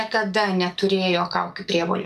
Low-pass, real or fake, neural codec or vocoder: 14.4 kHz; fake; codec, 44.1 kHz, 7.8 kbps, DAC